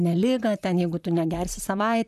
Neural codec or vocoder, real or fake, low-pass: vocoder, 44.1 kHz, 128 mel bands, Pupu-Vocoder; fake; 14.4 kHz